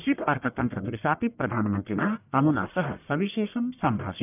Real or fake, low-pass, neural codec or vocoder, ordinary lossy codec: fake; 3.6 kHz; codec, 44.1 kHz, 1.7 kbps, Pupu-Codec; none